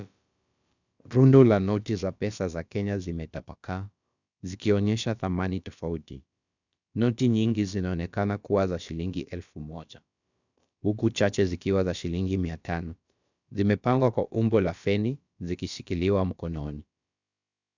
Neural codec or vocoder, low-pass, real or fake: codec, 16 kHz, about 1 kbps, DyCAST, with the encoder's durations; 7.2 kHz; fake